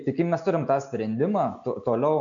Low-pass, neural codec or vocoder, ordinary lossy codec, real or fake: 7.2 kHz; none; AAC, 64 kbps; real